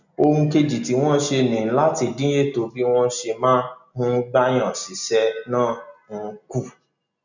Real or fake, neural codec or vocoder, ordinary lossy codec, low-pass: real; none; none; 7.2 kHz